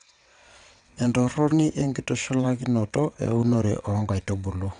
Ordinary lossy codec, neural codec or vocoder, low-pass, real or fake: none; vocoder, 22.05 kHz, 80 mel bands, WaveNeXt; 9.9 kHz; fake